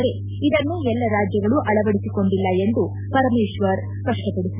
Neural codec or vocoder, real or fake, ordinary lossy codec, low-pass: none; real; none; 3.6 kHz